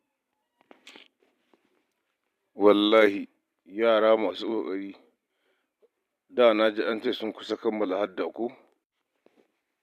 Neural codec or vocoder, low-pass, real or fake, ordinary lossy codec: vocoder, 44.1 kHz, 128 mel bands every 256 samples, BigVGAN v2; 14.4 kHz; fake; none